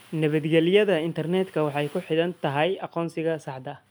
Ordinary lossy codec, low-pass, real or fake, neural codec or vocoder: none; none; real; none